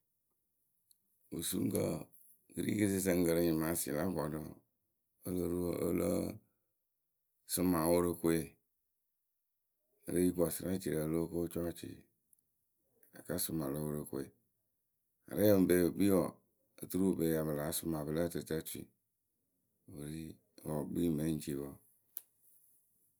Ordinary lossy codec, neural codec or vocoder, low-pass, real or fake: none; none; none; real